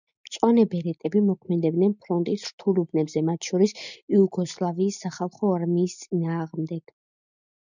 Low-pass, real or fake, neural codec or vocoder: 7.2 kHz; real; none